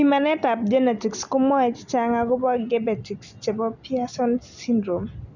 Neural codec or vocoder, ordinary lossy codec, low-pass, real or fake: none; none; 7.2 kHz; real